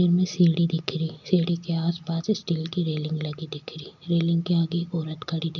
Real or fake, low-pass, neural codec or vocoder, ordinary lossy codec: real; 7.2 kHz; none; none